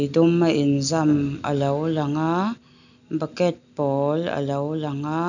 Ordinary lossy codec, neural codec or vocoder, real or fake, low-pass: none; none; real; 7.2 kHz